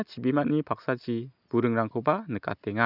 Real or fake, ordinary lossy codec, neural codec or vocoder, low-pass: real; none; none; 5.4 kHz